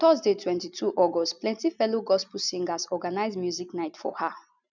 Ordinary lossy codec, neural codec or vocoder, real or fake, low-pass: none; none; real; 7.2 kHz